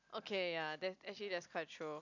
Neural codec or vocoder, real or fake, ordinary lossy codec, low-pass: none; real; none; 7.2 kHz